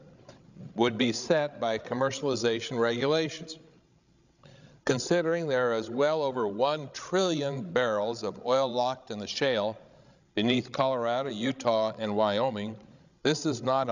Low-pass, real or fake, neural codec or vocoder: 7.2 kHz; fake; codec, 16 kHz, 16 kbps, FreqCodec, larger model